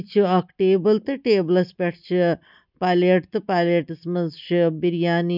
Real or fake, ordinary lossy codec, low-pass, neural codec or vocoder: real; none; 5.4 kHz; none